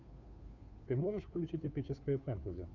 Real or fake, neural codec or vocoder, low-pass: fake; codec, 16 kHz, 2 kbps, FunCodec, trained on Chinese and English, 25 frames a second; 7.2 kHz